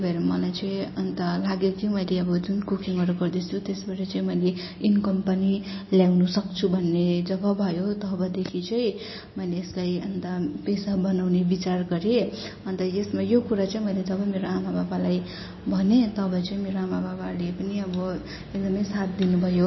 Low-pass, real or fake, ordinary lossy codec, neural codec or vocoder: 7.2 kHz; real; MP3, 24 kbps; none